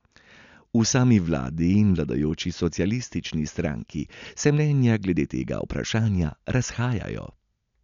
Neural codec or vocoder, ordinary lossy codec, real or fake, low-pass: none; none; real; 7.2 kHz